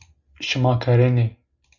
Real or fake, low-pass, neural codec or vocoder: real; 7.2 kHz; none